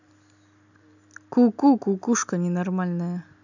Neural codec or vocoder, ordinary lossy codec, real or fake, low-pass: none; none; real; 7.2 kHz